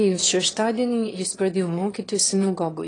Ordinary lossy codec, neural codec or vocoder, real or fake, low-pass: AAC, 32 kbps; autoencoder, 22.05 kHz, a latent of 192 numbers a frame, VITS, trained on one speaker; fake; 9.9 kHz